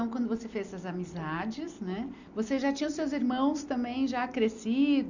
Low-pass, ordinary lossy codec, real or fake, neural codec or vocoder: 7.2 kHz; none; real; none